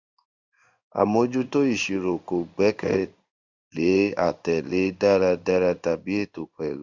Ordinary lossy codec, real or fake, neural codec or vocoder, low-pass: Opus, 64 kbps; fake; codec, 16 kHz in and 24 kHz out, 1 kbps, XY-Tokenizer; 7.2 kHz